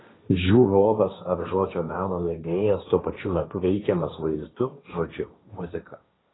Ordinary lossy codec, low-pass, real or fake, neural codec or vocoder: AAC, 16 kbps; 7.2 kHz; fake; codec, 16 kHz, 1.1 kbps, Voila-Tokenizer